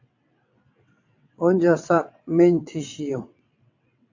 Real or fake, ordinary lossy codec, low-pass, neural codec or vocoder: fake; AAC, 48 kbps; 7.2 kHz; vocoder, 22.05 kHz, 80 mel bands, WaveNeXt